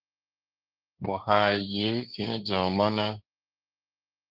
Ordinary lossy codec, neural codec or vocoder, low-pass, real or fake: Opus, 24 kbps; codec, 16 kHz, 1.1 kbps, Voila-Tokenizer; 5.4 kHz; fake